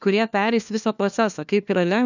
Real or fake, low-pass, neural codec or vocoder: fake; 7.2 kHz; codec, 16 kHz, 1 kbps, FunCodec, trained on LibriTTS, 50 frames a second